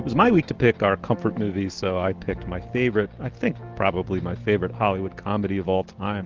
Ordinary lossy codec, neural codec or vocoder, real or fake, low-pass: Opus, 16 kbps; none; real; 7.2 kHz